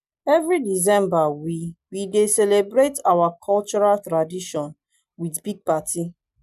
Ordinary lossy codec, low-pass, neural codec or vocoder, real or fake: none; 14.4 kHz; none; real